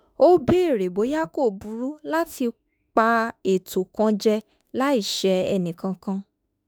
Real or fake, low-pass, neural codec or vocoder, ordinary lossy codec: fake; none; autoencoder, 48 kHz, 32 numbers a frame, DAC-VAE, trained on Japanese speech; none